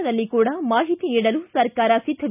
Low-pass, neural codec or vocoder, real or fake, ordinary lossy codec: 3.6 kHz; none; real; none